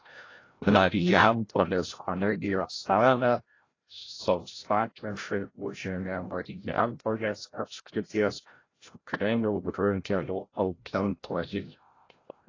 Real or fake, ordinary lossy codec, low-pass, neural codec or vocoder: fake; AAC, 32 kbps; 7.2 kHz; codec, 16 kHz, 0.5 kbps, FreqCodec, larger model